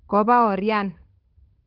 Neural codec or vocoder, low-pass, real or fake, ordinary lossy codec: codec, 16 kHz, 8 kbps, FunCodec, trained on Chinese and English, 25 frames a second; 5.4 kHz; fake; Opus, 32 kbps